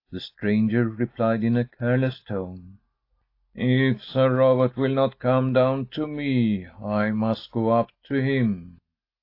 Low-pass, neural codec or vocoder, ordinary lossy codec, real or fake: 5.4 kHz; none; AAC, 32 kbps; real